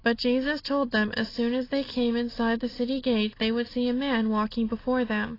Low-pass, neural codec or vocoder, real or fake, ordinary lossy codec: 5.4 kHz; none; real; AAC, 24 kbps